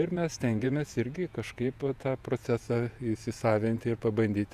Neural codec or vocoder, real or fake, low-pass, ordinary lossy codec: vocoder, 48 kHz, 128 mel bands, Vocos; fake; 14.4 kHz; Opus, 64 kbps